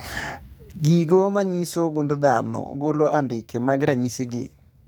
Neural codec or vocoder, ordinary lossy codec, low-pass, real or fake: codec, 44.1 kHz, 2.6 kbps, SNAC; none; none; fake